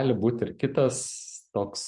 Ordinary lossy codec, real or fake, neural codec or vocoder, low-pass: MP3, 64 kbps; real; none; 10.8 kHz